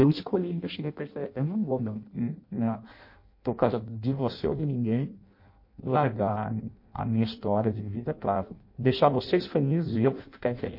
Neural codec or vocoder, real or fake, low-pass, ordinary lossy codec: codec, 16 kHz in and 24 kHz out, 0.6 kbps, FireRedTTS-2 codec; fake; 5.4 kHz; MP3, 32 kbps